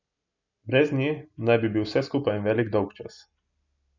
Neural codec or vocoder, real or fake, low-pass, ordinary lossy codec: none; real; 7.2 kHz; none